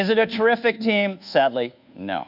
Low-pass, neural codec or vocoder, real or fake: 5.4 kHz; codec, 24 kHz, 1.2 kbps, DualCodec; fake